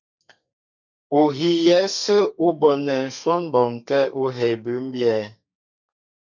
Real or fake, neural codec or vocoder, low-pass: fake; codec, 32 kHz, 1.9 kbps, SNAC; 7.2 kHz